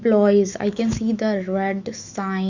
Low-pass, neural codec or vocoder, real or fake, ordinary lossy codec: 7.2 kHz; autoencoder, 48 kHz, 128 numbers a frame, DAC-VAE, trained on Japanese speech; fake; Opus, 64 kbps